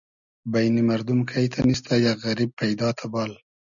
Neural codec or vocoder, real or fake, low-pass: none; real; 7.2 kHz